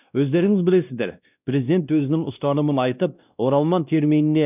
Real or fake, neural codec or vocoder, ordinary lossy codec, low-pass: fake; codec, 16 kHz, 1 kbps, X-Codec, WavLM features, trained on Multilingual LibriSpeech; none; 3.6 kHz